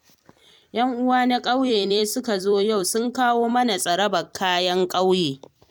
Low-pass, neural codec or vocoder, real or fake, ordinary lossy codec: 19.8 kHz; vocoder, 44.1 kHz, 128 mel bands every 256 samples, BigVGAN v2; fake; MP3, 96 kbps